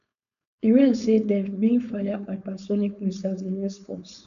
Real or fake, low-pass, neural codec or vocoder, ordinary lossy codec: fake; 7.2 kHz; codec, 16 kHz, 4.8 kbps, FACodec; none